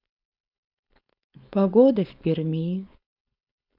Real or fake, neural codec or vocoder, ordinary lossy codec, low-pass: fake; codec, 16 kHz, 4.8 kbps, FACodec; none; 5.4 kHz